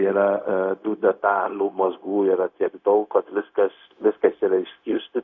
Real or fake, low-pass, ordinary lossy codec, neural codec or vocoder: fake; 7.2 kHz; MP3, 48 kbps; codec, 16 kHz, 0.4 kbps, LongCat-Audio-Codec